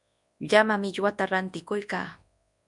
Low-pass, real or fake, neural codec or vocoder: 10.8 kHz; fake; codec, 24 kHz, 0.9 kbps, WavTokenizer, large speech release